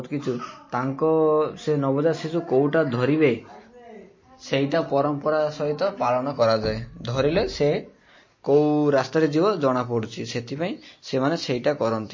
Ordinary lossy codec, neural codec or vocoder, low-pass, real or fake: MP3, 32 kbps; none; 7.2 kHz; real